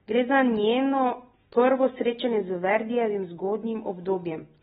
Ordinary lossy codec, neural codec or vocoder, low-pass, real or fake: AAC, 16 kbps; none; 19.8 kHz; real